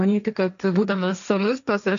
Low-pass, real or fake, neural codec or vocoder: 7.2 kHz; fake; codec, 16 kHz, 1.1 kbps, Voila-Tokenizer